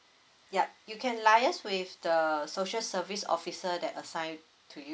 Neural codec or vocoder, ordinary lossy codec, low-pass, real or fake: none; none; none; real